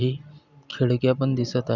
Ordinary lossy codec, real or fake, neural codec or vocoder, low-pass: none; real; none; 7.2 kHz